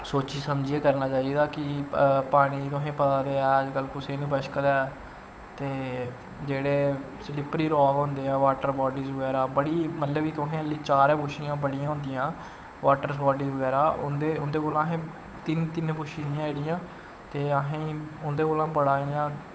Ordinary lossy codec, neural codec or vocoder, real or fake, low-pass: none; codec, 16 kHz, 8 kbps, FunCodec, trained on Chinese and English, 25 frames a second; fake; none